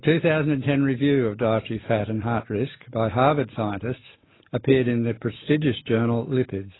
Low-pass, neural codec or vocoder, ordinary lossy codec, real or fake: 7.2 kHz; none; AAC, 16 kbps; real